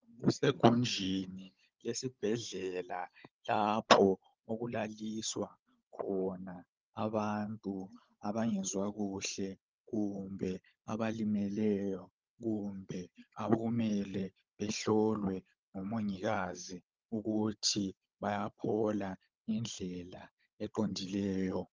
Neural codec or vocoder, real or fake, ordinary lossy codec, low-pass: codec, 16 kHz, 16 kbps, FunCodec, trained on Chinese and English, 50 frames a second; fake; Opus, 24 kbps; 7.2 kHz